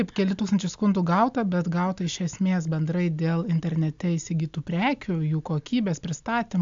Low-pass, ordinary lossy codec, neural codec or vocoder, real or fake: 7.2 kHz; AAC, 64 kbps; none; real